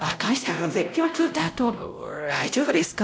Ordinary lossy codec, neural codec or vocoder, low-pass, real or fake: none; codec, 16 kHz, 0.5 kbps, X-Codec, WavLM features, trained on Multilingual LibriSpeech; none; fake